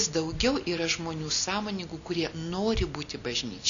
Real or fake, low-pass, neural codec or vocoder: real; 7.2 kHz; none